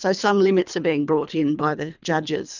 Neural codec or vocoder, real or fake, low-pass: codec, 24 kHz, 3 kbps, HILCodec; fake; 7.2 kHz